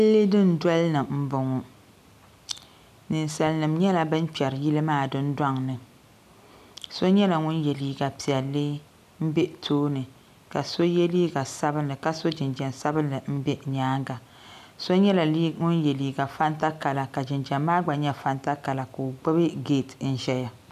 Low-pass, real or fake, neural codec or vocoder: 14.4 kHz; real; none